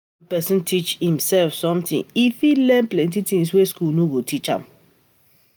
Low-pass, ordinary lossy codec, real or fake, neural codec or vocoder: none; none; real; none